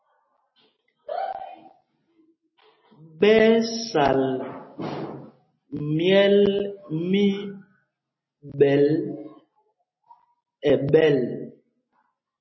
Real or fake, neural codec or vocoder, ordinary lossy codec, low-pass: real; none; MP3, 24 kbps; 7.2 kHz